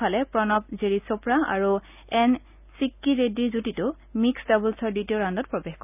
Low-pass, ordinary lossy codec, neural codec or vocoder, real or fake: 3.6 kHz; none; none; real